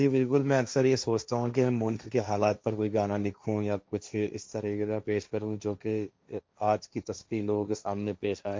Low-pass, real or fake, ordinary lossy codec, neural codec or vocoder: none; fake; none; codec, 16 kHz, 1.1 kbps, Voila-Tokenizer